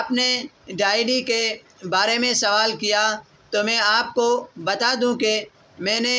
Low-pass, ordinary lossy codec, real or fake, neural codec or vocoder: none; none; real; none